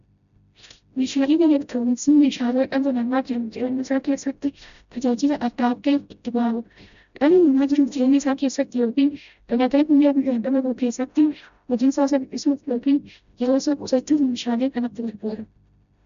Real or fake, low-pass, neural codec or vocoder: fake; 7.2 kHz; codec, 16 kHz, 0.5 kbps, FreqCodec, smaller model